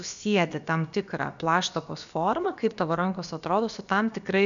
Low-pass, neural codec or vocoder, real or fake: 7.2 kHz; codec, 16 kHz, about 1 kbps, DyCAST, with the encoder's durations; fake